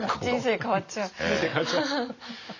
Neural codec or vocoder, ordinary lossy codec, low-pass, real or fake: none; none; 7.2 kHz; real